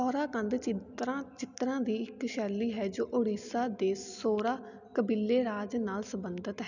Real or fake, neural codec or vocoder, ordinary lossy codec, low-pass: real; none; none; 7.2 kHz